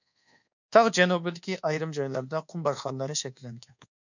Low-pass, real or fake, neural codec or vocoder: 7.2 kHz; fake; codec, 24 kHz, 1.2 kbps, DualCodec